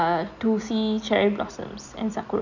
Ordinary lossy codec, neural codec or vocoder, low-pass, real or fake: none; none; 7.2 kHz; real